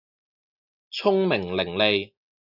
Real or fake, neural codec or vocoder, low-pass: real; none; 5.4 kHz